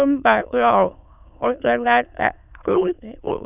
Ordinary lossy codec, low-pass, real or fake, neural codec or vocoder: none; 3.6 kHz; fake; autoencoder, 22.05 kHz, a latent of 192 numbers a frame, VITS, trained on many speakers